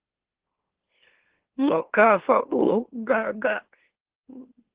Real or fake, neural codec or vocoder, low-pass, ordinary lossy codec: fake; autoencoder, 44.1 kHz, a latent of 192 numbers a frame, MeloTTS; 3.6 kHz; Opus, 16 kbps